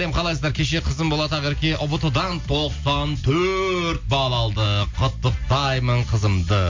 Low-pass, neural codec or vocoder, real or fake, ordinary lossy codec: 7.2 kHz; none; real; MP3, 48 kbps